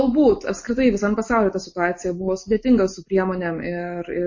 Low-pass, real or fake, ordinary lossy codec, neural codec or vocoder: 7.2 kHz; real; MP3, 32 kbps; none